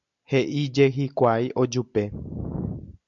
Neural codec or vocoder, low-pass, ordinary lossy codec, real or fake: none; 7.2 kHz; MP3, 96 kbps; real